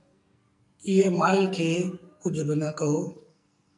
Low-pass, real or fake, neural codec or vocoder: 10.8 kHz; fake; codec, 44.1 kHz, 2.6 kbps, SNAC